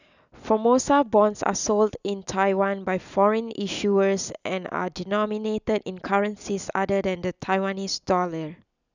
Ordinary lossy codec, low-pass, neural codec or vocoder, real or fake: none; 7.2 kHz; none; real